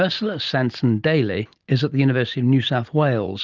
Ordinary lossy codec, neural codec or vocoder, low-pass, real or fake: Opus, 32 kbps; none; 7.2 kHz; real